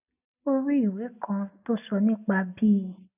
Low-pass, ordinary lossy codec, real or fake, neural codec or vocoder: 3.6 kHz; none; real; none